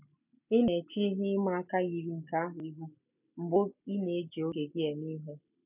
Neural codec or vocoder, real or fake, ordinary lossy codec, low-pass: none; real; none; 3.6 kHz